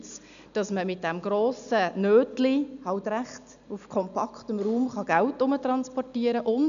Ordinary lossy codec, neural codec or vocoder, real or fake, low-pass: none; none; real; 7.2 kHz